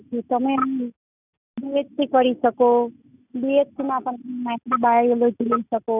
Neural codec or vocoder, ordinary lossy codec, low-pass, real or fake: none; none; 3.6 kHz; real